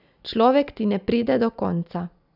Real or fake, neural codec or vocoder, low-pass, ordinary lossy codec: real; none; 5.4 kHz; none